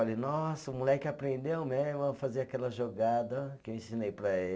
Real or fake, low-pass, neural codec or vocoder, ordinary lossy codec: real; none; none; none